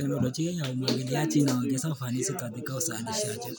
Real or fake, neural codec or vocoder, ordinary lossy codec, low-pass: real; none; none; none